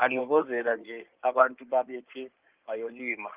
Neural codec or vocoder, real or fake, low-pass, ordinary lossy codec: codec, 16 kHz in and 24 kHz out, 2.2 kbps, FireRedTTS-2 codec; fake; 3.6 kHz; Opus, 32 kbps